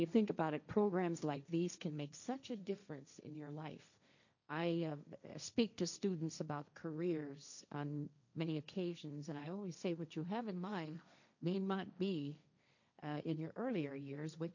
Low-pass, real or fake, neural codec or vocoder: 7.2 kHz; fake; codec, 16 kHz, 1.1 kbps, Voila-Tokenizer